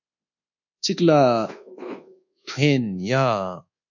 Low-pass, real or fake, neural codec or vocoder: 7.2 kHz; fake; codec, 24 kHz, 1.2 kbps, DualCodec